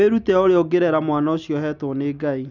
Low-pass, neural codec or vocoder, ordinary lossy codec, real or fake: 7.2 kHz; none; none; real